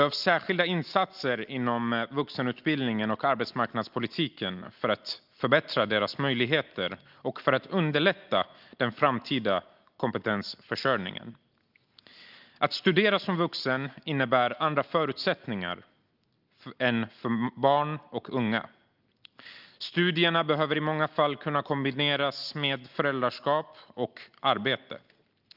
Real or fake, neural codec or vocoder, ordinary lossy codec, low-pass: real; none; Opus, 24 kbps; 5.4 kHz